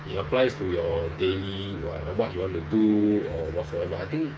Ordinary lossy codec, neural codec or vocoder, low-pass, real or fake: none; codec, 16 kHz, 4 kbps, FreqCodec, smaller model; none; fake